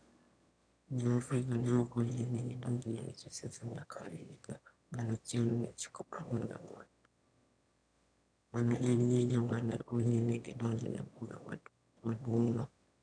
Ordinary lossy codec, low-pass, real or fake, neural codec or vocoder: none; 9.9 kHz; fake; autoencoder, 22.05 kHz, a latent of 192 numbers a frame, VITS, trained on one speaker